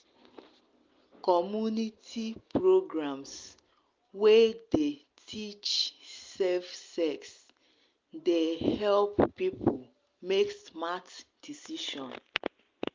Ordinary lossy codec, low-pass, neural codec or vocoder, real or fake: Opus, 32 kbps; 7.2 kHz; none; real